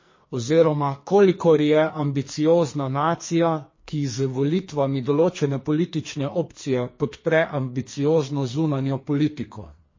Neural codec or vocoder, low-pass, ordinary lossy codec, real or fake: codec, 44.1 kHz, 2.6 kbps, SNAC; 7.2 kHz; MP3, 32 kbps; fake